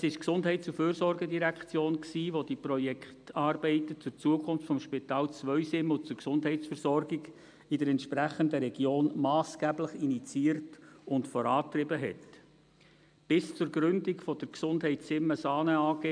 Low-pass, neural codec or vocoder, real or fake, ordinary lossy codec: 9.9 kHz; none; real; none